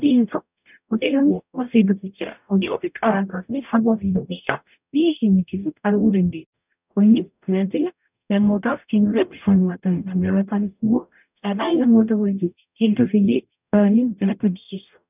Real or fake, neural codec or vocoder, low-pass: fake; codec, 44.1 kHz, 0.9 kbps, DAC; 3.6 kHz